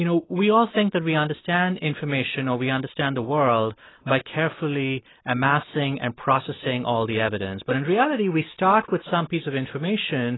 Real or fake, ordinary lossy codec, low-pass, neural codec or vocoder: real; AAC, 16 kbps; 7.2 kHz; none